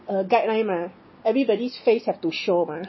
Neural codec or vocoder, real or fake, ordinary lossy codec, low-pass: none; real; MP3, 24 kbps; 7.2 kHz